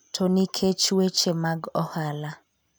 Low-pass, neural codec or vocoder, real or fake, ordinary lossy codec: none; none; real; none